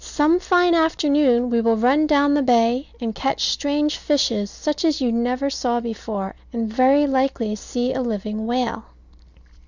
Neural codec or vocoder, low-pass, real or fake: none; 7.2 kHz; real